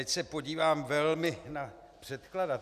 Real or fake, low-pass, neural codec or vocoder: real; 14.4 kHz; none